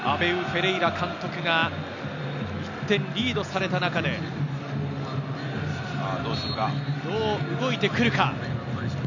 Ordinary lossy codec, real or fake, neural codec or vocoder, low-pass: none; real; none; 7.2 kHz